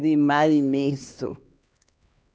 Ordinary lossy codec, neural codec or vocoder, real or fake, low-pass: none; codec, 16 kHz, 2 kbps, X-Codec, HuBERT features, trained on LibriSpeech; fake; none